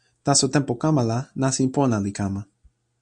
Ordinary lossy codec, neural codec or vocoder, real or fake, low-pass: Opus, 64 kbps; none; real; 9.9 kHz